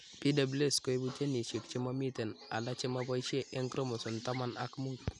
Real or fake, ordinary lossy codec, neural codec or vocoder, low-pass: real; none; none; 10.8 kHz